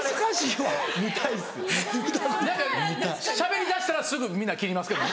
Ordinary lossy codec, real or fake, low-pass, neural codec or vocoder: none; real; none; none